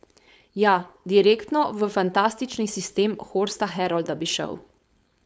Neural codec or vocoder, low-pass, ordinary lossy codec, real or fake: codec, 16 kHz, 4.8 kbps, FACodec; none; none; fake